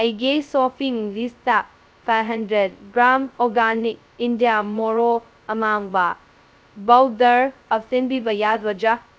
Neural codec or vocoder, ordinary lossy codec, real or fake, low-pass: codec, 16 kHz, 0.2 kbps, FocalCodec; none; fake; none